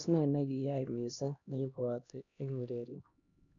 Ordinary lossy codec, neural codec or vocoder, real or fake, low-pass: none; codec, 16 kHz, 1 kbps, X-Codec, HuBERT features, trained on LibriSpeech; fake; 7.2 kHz